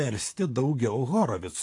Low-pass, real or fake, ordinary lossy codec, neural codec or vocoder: 10.8 kHz; fake; AAC, 48 kbps; vocoder, 44.1 kHz, 128 mel bands, Pupu-Vocoder